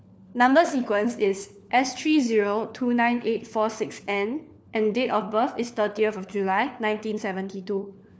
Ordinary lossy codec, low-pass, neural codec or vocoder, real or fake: none; none; codec, 16 kHz, 4 kbps, FunCodec, trained on LibriTTS, 50 frames a second; fake